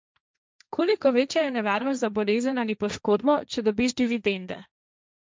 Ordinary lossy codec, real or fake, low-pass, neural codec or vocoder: none; fake; none; codec, 16 kHz, 1.1 kbps, Voila-Tokenizer